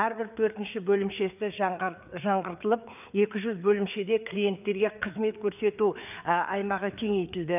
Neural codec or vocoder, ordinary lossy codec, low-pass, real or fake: codec, 16 kHz, 4 kbps, FreqCodec, larger model; none; 3.6 kHz; fake